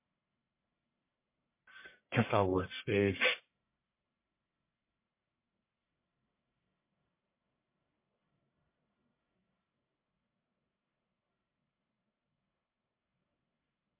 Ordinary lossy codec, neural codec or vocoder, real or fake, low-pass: MP3, 24 kbps; codec, 44.1 kHz, 1.7 kbps, Pupu-Codec; fake; 3.6 kHz